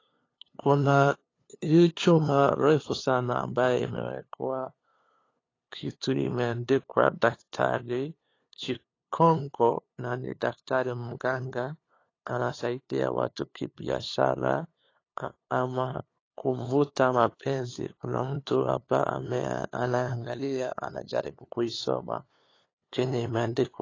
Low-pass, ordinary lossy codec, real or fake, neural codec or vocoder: 7.2 kHz; AAC, 32 kbps; fake; codec, 16 kHz, 2 kbps, FunCodec, trained on LibriTTS, 25 frames a second